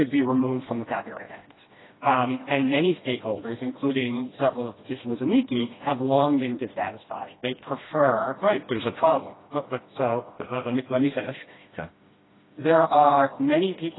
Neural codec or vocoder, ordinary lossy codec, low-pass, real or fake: codec, 16 kHz, 1 kbps, FreqCodec, smaller model; AAC, 16 kbps; 7.2 kHz; fake